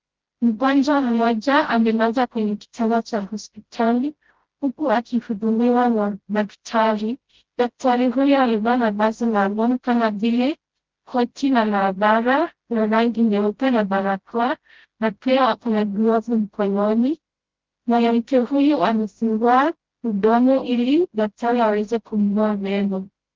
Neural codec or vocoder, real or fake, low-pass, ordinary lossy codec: codec, 16 kHz, 0.5 kbps, FreqCodec, smaller model; fake; 7.2 kHz; Opus, 16 kbps